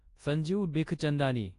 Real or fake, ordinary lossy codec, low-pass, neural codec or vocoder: fake; AAC, 48 kbps; 10.8 kHz; codec, 24 kHz, 0.9 kbps, WavTokenizer, large speech release